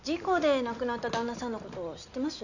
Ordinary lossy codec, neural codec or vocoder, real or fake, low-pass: none; none; real; 7.2 kHz